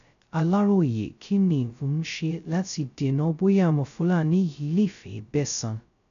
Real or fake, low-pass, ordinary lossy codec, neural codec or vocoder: fake; 7.2 kHz; none; codec, 16 kHz, 0.2 kbps, FocalCodec